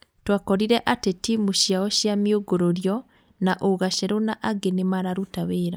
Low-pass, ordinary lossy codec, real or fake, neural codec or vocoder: none; none; real; none